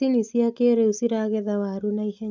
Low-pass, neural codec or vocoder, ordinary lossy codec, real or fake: 7.2 kHz; none; none; real